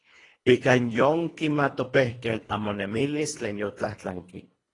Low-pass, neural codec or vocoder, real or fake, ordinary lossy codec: 10.8 kHz; codec, 24 kHz, 1.5 kbps, HILCodec; fake; AAC, 32 kbps